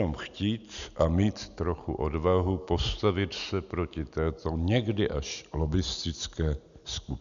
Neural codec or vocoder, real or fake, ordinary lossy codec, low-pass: none; real; MP3, 96 kbps; 7.2 kHz